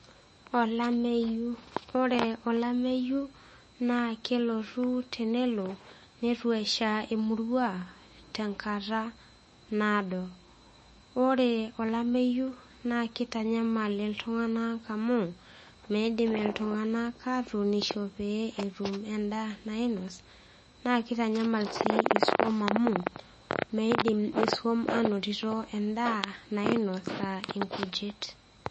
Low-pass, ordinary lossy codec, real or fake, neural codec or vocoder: 10.8 kHz; MP3, 32 kbps; fake; autoencoder, 48 kHz, 128 numbers a frame, DAC-VAE, trained on Japanese speech